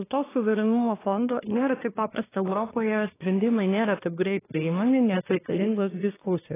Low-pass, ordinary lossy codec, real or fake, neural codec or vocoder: 3.6 kHz; AAC, 16 kbps; fake; codec, 24 kHz, 1 kbps, SNAC